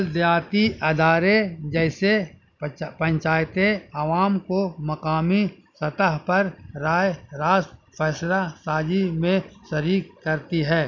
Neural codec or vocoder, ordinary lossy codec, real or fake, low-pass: none; none; real; 7.2 kHz